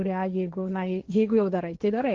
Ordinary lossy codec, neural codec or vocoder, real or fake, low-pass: Opus, 16 kbps; codec, 16 kHz, 1.1 kbps, Voila-Tokenizer; fake; 7.2 kHz